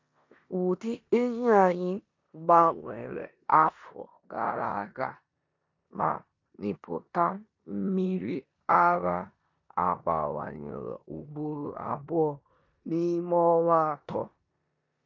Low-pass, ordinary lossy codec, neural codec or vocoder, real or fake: 7.2 kHz; MP3, 48 kbps; codec, 16 kHz in and 24 kHz out, 0.9 kbps, LongCat-Audio-Codec, four codebook decoder; fake